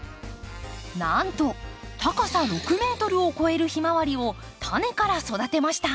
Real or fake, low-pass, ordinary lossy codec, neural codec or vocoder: real; none; none; none